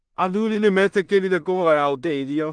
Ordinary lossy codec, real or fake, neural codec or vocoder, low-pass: Opus, 24 kbps; fake; codec, 16 kHz in and 24 kHz out, 0.4 kbps, LongCat-Audio-Codec, two codebook decoder; 9.9 kHz